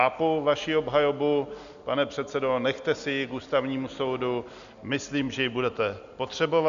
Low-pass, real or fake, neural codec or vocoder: 7.2 kHz; real; none